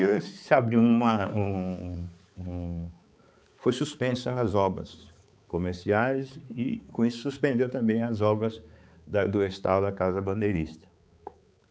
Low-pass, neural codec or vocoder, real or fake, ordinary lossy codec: none; codec, 16 kHz, 4 kbps, X-Codec, HuBERT features, trained on balanced general audio; fake; none